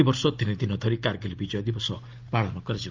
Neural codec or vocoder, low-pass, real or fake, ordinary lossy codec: none; 7.2 kHz; real; Opus, 32 kbps